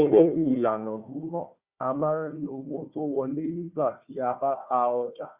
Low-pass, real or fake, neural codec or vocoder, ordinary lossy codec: 3.6 kHz; fake; codec, 16 kHz, 1 kbps, FunCodec, trained on Chinese and English, 50 frames a second; Opus, 64 kbps